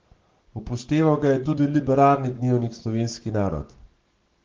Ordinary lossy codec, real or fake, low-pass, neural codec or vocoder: Opus, 16 kbps; fake; 7.2 kHz; codec, 44.1 kHz, 7.8 kbps, Pupu-Codec